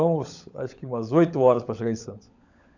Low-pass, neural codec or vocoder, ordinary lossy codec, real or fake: 7.2 kHz; codec, 16 kHz, 16 kbps, FunCodec, trained on LibriTTS, 50 frames a second; none; fake